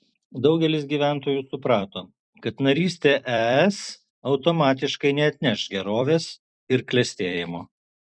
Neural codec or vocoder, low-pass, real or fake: vocoder, 44.1 kHz, 128 mel bands every 256 samples, BigVGAN v2; 9.9 kHz; fake